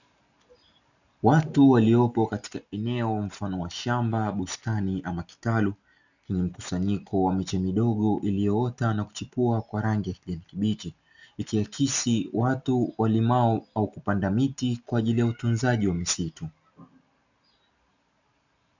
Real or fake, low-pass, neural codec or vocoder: real; 7.2 kHz; none